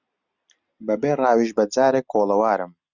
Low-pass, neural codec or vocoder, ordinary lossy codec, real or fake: 7.2 kHz; none; Opus, 64 kbps; real